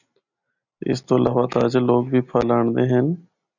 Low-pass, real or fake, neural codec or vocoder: 7.2 kHz; real; none